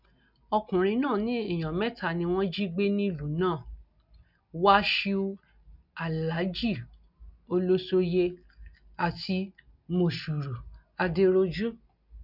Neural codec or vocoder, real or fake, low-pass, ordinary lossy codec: none; real; 5.4 kHz; none